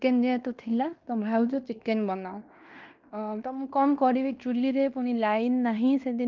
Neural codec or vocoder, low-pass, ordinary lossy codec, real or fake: codec, 16 kHz in and 24 kHz out, 0.9 kbps, LongCat-Audio-Codec, fine tuned four codebook decoder; 7.2 kHz; Opus, 24 kbps; fake